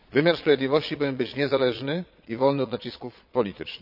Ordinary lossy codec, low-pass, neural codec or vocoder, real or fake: none; 5.4 kHz; vocoder, 22.05 kHz, 80 mel bands, Vocos; fake